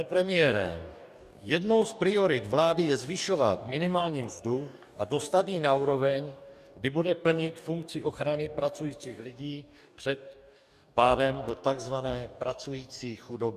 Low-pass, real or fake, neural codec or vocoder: 14.4 kHz; fake; codec, 44.1 kHz, 2.6 kbps, DAC